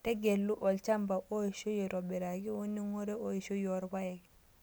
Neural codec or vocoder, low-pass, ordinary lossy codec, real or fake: none; none; none; real